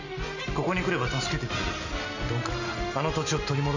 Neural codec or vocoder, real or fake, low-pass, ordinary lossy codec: none; real; 7.2 kHz; none